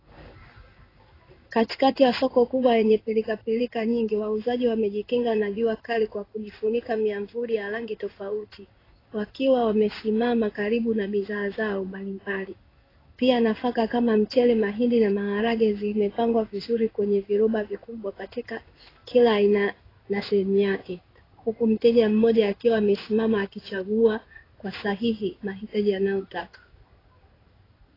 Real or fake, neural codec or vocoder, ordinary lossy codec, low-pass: fake; codec, 16 kHz in and 24 kHz out, 1 kbps, XY-Tokenizer; AAC, 24 kbps; 5.4 kHz